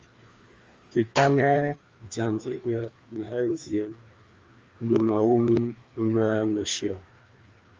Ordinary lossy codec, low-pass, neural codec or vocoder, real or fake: Opus, 32 kbps; 7.2 kHz; codec, 16 kHz, 2 kbps, FreqCodec, larger model; fake